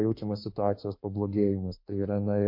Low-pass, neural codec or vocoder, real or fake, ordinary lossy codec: 5.4 kHz; autoencoder, 48 kHz, 32 numbers a frame, DAC-VAE, trained on Japanese speech; fake; MP3, 32 kbps